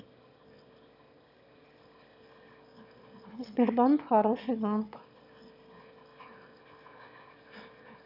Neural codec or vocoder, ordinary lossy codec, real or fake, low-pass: autoencoder, 22.05 kHz, a latent of 192 numbers a frame, VITS, trained on one speaker; none; fake; 5.4 kHz